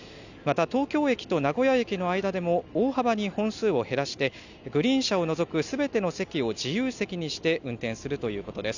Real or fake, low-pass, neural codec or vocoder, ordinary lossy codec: real; 7.2 kHz; none; none